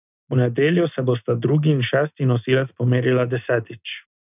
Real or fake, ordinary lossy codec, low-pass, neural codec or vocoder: real; none; 3.6 kHz; none